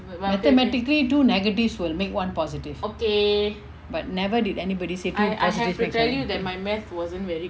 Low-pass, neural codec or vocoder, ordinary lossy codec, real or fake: none; none; none; real